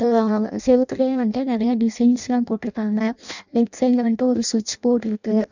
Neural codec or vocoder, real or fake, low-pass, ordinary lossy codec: codec, 16 kHz in and 24 kHz out, 0.6 kbps, FireRedTTS-2 codec; fake; 7.2 kHz; none